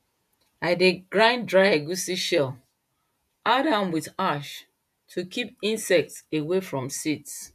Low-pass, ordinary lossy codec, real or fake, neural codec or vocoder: 14.4 kHz; none; fake; vocoder, 48 kHz, 128 mel bands, Vocos